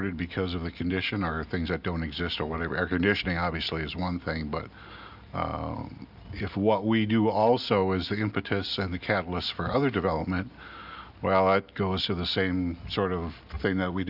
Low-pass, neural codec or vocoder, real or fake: 5.4 kHz; none; real